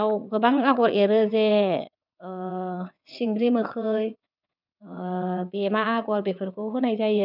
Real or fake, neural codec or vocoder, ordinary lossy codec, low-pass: fake; vocoder, 22.05 kHz, 80 mel bands, WaveNeXt; none; 5.4 kHz